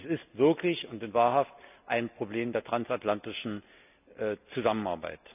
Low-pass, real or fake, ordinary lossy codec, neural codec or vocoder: 3.6 kHz; real; none; none